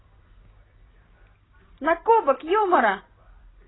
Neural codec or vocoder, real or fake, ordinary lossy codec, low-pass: vocoder, 44.1 kHz, 128 mel bands every 256 samples, BigVGAN v2; fake; AAC, 16 kbps; 7.2 kHz